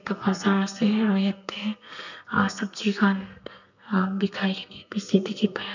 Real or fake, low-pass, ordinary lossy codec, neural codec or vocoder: fake; 7.2 kHz; none; codec, 32 kHz, 1.9 kbps, SNAC